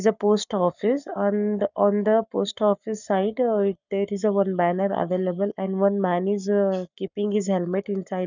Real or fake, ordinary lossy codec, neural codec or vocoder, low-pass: fake; none; codec, 44.1 kHz, 7.8 kbps, Pupu-Codec; 7.2 kHz